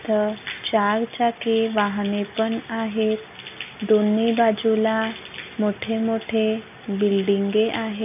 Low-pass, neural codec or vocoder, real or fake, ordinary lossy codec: 3.6 kHz; none; real; AAC, 32 kbps